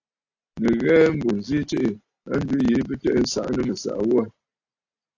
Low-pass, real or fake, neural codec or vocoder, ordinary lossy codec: 7.2 kHz; real; none; AAC, 48 kbps